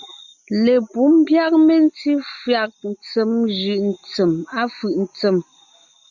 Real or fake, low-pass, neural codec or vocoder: real; 7.2 kHz; none